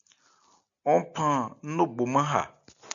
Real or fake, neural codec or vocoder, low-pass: real; none; 7.2 kHz